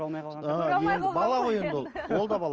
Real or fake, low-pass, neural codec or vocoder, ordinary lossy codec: real; 7.2 kHz; none; Opus, 24 kbps